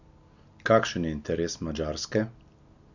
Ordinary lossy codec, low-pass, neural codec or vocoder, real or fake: none; 7.2 kHz; none; real